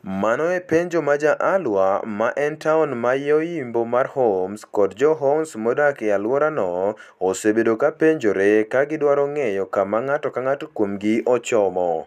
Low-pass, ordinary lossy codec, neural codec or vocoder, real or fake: 14.4 kHz; none; none; real